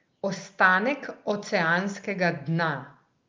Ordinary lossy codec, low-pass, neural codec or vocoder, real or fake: Opus, 24 kbps; 7.2 kHz; none; real